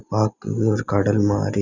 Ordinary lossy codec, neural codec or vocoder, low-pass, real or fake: none; none; 7.2 kHz; real